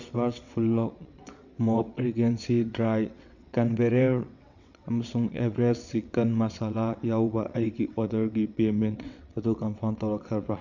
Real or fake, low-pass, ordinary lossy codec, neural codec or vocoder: fake; 7.2 kHz; none; vocoder, 22.05 kHz, 80 mel bands, WaveNeXt